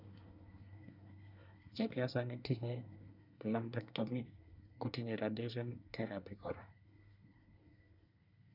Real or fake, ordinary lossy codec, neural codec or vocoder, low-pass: fake; none; codec, 24 kHz, 1 kbps, SNAC; 5.4 kHz